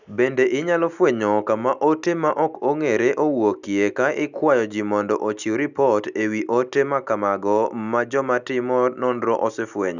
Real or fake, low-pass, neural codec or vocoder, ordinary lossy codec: real; 7.2 kHz; none; none